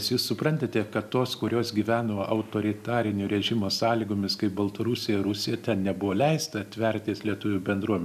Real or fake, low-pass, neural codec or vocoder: real; 14.4 kHz; none